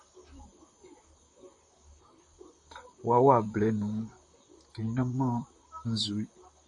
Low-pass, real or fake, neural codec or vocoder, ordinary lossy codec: 10.8 kHz; fake; vocoder, 44.1 kHz, 128 mel bands, Pupu-Vocoder; MP3, 48 kbps